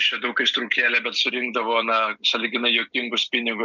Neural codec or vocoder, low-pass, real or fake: none; 7.2 kHz; real